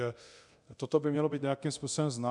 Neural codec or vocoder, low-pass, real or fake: codec, 24 kHz, 0.9 kbps, DualCodec; 10.8 kHz; fake